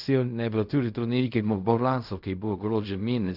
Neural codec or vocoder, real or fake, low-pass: codec, 16 kHz in and 24 kHz out, 0.4 kbps, LongCat-Audio-Codec, fine tuned four codebook decoder; fake; 5.4 kHz